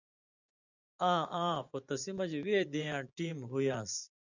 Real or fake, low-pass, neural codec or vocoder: fake; 7.2 kHz; vocoder, 24 kHz, 100 mel bands, Vocos